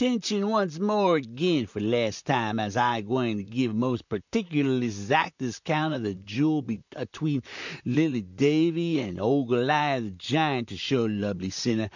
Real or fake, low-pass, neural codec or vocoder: fake; 7.2 kHz; vocoder, 44.1 kHz, 128 mel bands, Pupu-Vocoder